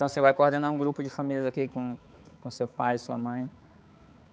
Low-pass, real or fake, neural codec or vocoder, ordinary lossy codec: none; fake; codec, 16 kHz, 4 kbps, X-Codec, HuBERT features, trained on balanced general audio; none